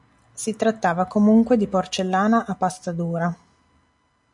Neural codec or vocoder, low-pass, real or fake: none; 10.8 kHz; real